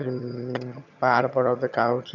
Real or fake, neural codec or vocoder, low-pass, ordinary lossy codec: fake; vocoder, 22.05 kHz, 80 mel bands, HiFi-GAN; 7.2 kHz; none